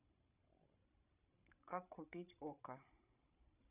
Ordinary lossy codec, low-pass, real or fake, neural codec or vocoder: none; 3.6 kHz; fake; codec, 16 kHz, 16 kbps, FreqCodec, larger model